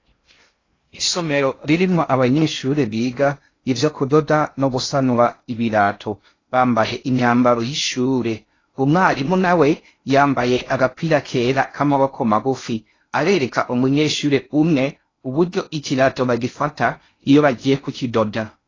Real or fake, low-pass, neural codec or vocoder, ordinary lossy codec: fake; 7.2 kHz; codec, 16 kHz in and 24 kHz out, 0.6 kbps, FocalCodec, streaming, 2048 codes; AAC, 32 kbps